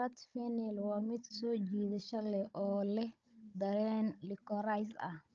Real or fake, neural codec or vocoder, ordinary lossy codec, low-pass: fake; codec, 16 kHz, 16 kbps, FunCodec, trained on Chinese and English, 50 frames a second; Opus, 24 kbps; 7.2 kHz